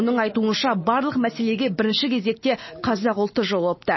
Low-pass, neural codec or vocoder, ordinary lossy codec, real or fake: 7.2 kHz; none; MP3, 24 kbps; real